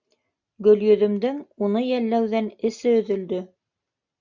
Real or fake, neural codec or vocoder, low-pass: real; none; 7.2 kHz